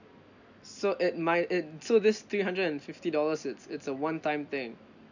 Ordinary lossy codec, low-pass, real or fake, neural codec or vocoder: none; 7.2 kHz; real; none